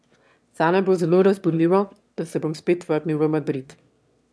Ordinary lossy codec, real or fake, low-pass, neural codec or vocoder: none; fake; none; autoencoder, 22.05 kHz, a latent of 192 numbers a frame, VITS, trained on one speaker